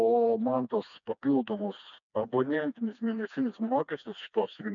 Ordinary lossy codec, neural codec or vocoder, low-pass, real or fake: MP3, 96 kbps; codec, 16 kHz, 2 kbps, FreqCodec, smaller model; 7.2 kHz; fake